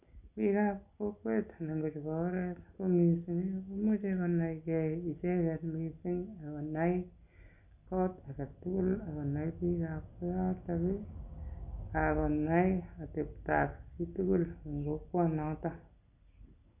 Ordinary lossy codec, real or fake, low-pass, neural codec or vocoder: none; real; 3.6 kHz; none